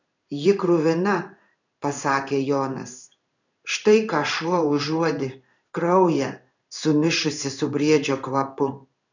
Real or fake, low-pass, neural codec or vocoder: fake; 7.2 kHz; codec, 16 kHz in and 24 kHz out, 1 kbps, XY-Tokenizer